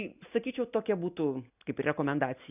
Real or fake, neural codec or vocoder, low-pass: real; none; 3.6 kHz